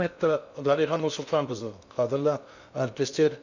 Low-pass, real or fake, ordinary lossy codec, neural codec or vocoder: 7.2 kHz; fake; none; codec, 16 kHz in and 24 kHz out, 0.6 kbps, FocalCodec, streaming, 2048 codes